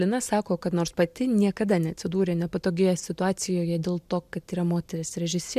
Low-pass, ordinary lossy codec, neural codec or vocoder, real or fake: 14.4 kHz; MP3, 96 kbps; none; real